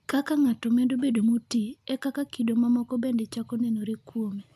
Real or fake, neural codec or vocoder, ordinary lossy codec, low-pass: real; none; none; 14.4 kHz